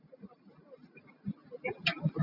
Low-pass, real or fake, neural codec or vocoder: 5.4 kHz; real; none